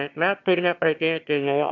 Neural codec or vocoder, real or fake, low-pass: autoencoder, 22.05 kHz, a latent of 192 numbers a frame, VITS, trained on one speaker; fake; 7.2 kHz